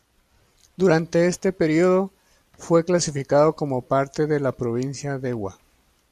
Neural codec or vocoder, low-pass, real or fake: none; 14.4 kHz; real